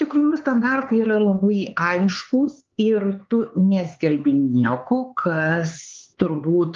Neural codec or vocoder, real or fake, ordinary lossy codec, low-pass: codec, 16 kHz, 4 kbps, X-Codec, HuBERT features, trained on LibriSpeech; fake; Opus, 32 kbps; 7.2 kHz